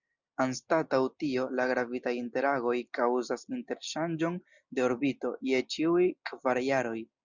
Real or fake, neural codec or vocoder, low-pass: real; none; 7.2 kHz